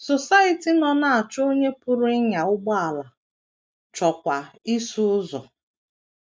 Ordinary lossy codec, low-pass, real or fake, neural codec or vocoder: none; none; real; none